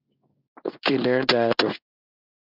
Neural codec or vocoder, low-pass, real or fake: codec, 16 kHz in and 24 kHz out, 1 kbps, XY-Tokenizer; 5.4 kHz; fake